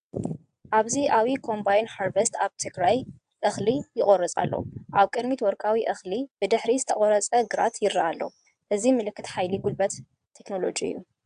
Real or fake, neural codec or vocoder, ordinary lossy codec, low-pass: fake; vocoder, 22.05 kHz, 80 mel bands, WaveNeXt; AAC, 96 kbps; 9.9 kHz